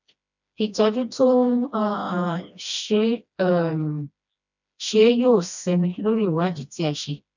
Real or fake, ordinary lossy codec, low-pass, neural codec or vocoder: fake; none; 7.2 kHz; codec, 16 kHz, 1 kbps, FreqCodec, smaller model